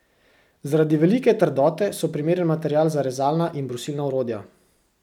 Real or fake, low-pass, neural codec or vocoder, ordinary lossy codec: real; 19.8 kHz; none; none